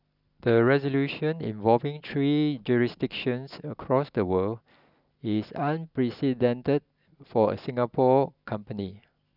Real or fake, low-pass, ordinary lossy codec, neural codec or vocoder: real; 5.4 kHz; none; none